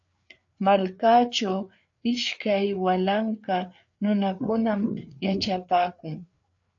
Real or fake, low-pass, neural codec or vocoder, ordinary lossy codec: fake; 7.2 kHz; codec, 16 kHz, 4 kbps, FreqCodec, larger model; AAC, 64 kbps